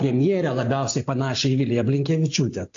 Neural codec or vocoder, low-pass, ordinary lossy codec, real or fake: none; 7.2 kHz; AAC, 48 kbps; real